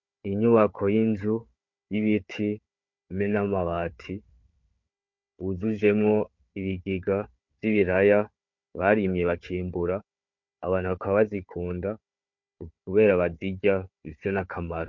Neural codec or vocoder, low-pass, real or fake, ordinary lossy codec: codec, 16 kHz, 4 kbps, FunCodec, trained on Chinese and English, 50 frames a second; 7.2 kHz; fake; MP3, 48 kbps